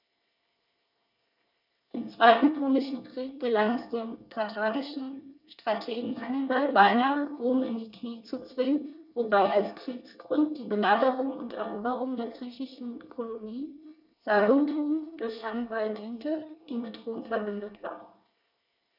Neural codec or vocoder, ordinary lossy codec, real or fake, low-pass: codec, 24 kHz, 1 kbps, SNAC; none; fake; 5.4 kHz